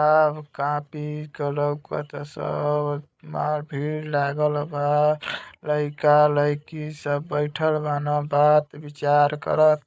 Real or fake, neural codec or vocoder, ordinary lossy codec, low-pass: fake; codec, 16 kHz, 8 kbps, FreqCodec, larger model; none; none